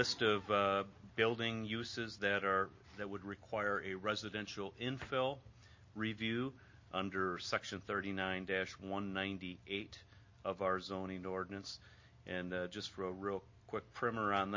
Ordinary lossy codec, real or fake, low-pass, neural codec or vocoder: MP3, 32 kbps; real; 7.2 kHz; none